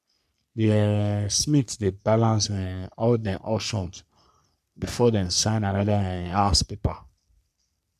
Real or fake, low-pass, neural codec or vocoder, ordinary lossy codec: fake; 14.4 kHz; codec, 44.1 kHz, 3.4 kbps, Pupu-Codec; none